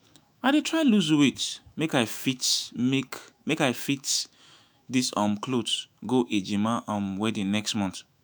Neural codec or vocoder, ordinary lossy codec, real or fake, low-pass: autoencoder, 48 kHz, 128 numbers a frame, DAC-VAE, trained on Japanese speech; none; fake; none